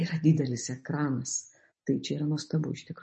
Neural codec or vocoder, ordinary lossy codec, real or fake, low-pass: none; MP3, 32 kbps; real; 10.8 kHz